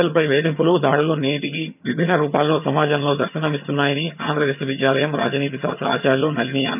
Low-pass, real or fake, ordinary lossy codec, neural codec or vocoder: 3.6 kHz; fake; none; vocoder, 22.05 kHz, 80 mel bands, HiFi-GAN